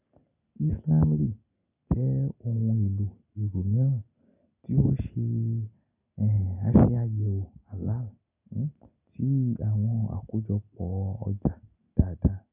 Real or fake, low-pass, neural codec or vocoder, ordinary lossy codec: real; 3.6 kHz; none; none